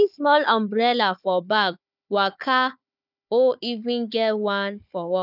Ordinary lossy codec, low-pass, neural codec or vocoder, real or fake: none; 5.4 kHz; codec, 16 kHz, 4 kbps, FunCodec, trained on Chinese and English, 50 frames a second; fake